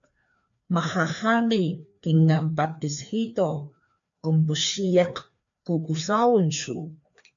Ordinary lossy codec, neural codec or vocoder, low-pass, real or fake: AAC, 64 kbps; codec, 16 kHz, 2 kbps, FreqCodec, larger model; 7.2 kHz; fake